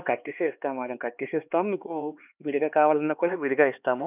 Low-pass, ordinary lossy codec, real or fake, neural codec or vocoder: 3.6 kHz; none; fake; codec, 16 kHz, 4 kbps, X-Codec, HuBERT features, trained on LibriSpeech